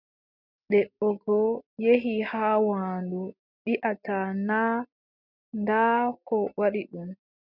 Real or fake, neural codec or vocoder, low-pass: real; none; 5.4 kHz